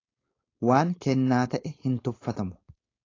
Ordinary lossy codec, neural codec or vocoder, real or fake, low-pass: AAC, 32 kbps; codec, 16 kHz, 4.8 kbps, FACodec; fake; 7.2 kHz